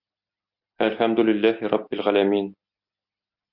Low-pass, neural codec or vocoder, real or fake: 5.4 kHz; none; real